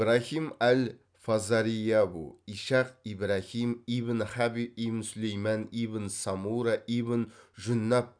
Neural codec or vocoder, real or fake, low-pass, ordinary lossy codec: none; real; 9.9 kHz; none